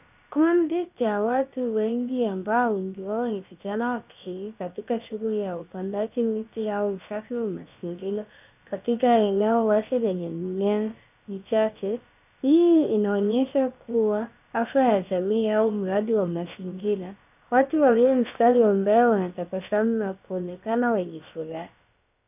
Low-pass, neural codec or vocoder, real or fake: 3.6 kHz; codec, 16 kHz, about 1 kbps, DyCAST, with the encoder's durations; fake